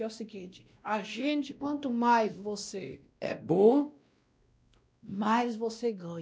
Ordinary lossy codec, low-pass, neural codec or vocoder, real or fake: none; none; codec, 16 kHz, 1 kbps, X-Codec, WavLM features, trained on Multilingual LibriSpeech; fake